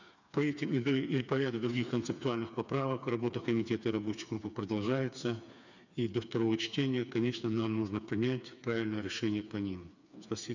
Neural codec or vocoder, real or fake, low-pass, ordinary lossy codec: codec, 16 kHz, 4 kbps, FreqCodec, smaller model; fake; 7.2 kHz; none